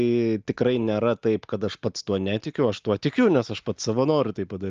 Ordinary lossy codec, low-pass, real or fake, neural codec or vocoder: Opus, 24 kbps; 7.2 kHz; real; none